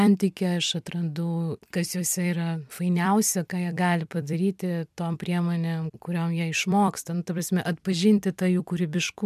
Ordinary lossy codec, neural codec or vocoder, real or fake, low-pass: MP3, 96 kbps; vocoder, 44.1 kHz, 128 mel bands every 256 samples, BigVGAN v2; fake; 14.4 kHz